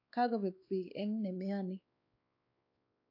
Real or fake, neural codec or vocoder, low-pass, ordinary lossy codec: fake; codec, 16 kHz, 2 kbps, X-Codec, WavLM features, trained on Multilingual LibriSpeech; 5.4 kHz; AAC, 48 kbps